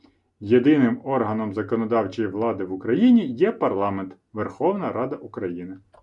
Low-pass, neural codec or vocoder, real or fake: 10.8 kHz; none; real